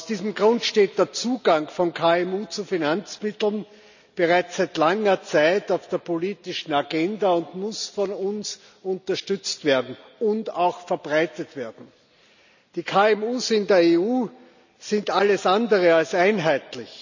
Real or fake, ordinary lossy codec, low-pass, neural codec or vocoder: real; none; 7.2 kHz; none